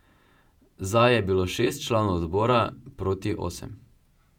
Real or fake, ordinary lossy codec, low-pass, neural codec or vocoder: fake; none; 19.8 kHz; vocoder, 48 kHz, 128 mel bands, Vocos